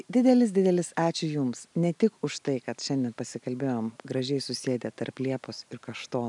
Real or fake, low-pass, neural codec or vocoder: real; 10.8 kHz; none